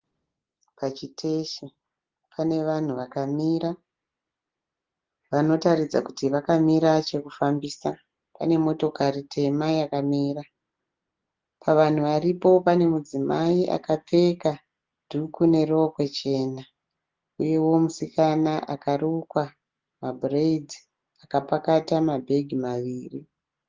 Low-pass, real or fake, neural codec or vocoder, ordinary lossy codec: 7.2 kHz; real; none; Opus, 16 kbps